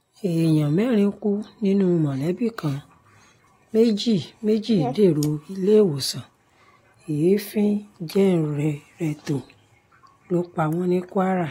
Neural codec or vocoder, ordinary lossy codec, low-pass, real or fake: none; AAC, 48 kbps; 19.8 kHz; real